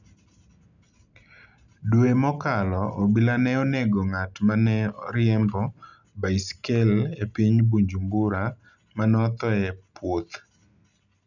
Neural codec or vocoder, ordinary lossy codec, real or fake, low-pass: none; none; real; 7.2 kHz